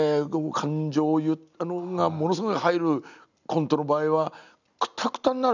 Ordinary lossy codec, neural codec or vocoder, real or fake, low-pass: none; none; real; 7.2 kHz